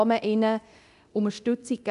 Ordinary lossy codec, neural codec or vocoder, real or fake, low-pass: none; codec, 24 kHz, 0.9 kbps, DualCodec; fake; 10.8 kHz